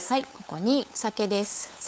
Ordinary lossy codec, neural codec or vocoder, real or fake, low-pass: none; codec, 16 kHz, 8 kbps, FunCodec, trained on LibriTTS, 25 frames a second; fake; none